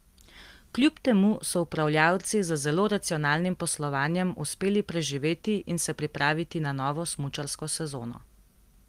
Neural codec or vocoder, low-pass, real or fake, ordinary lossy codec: none; 14.4 kHz; real; Opus, 24 kbps